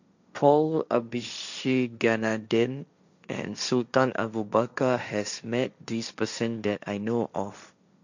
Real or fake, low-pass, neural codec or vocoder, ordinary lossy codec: fake; 7.2 kHz; codec, 16 kHz, 1.1 kbps, Voila-Tokenizer; none